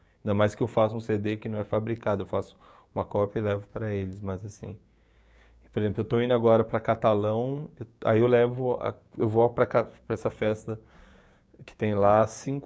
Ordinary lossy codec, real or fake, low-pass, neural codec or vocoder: none; fake; none; codec, 16 kHz, 6 kbps, DAC